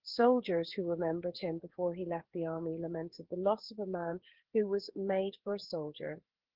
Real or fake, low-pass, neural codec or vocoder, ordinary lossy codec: fake; 5.4 kHz; codec, 16 kHz, 8 kbps, FreqCodec, smaller model; Opus, 16 kbps